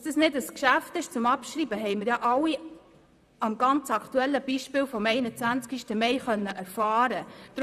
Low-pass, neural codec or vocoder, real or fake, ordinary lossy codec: 14.4 kHz; vocoder, 44.1 kHz, 128 mel bands, Pupu-Vocoder; fake; none